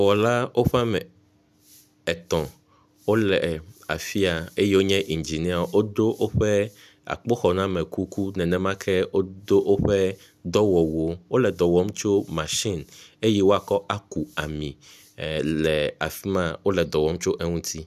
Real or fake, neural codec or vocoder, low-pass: real; none; 14.4 kHz